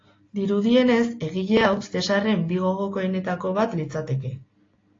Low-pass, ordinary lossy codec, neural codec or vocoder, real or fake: 7.2 kHz; AAC, 32 kbps; none; real